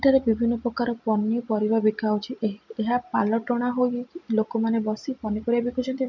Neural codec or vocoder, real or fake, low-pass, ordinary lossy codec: none; real; 7.2 kHz; MP3, 64 kbps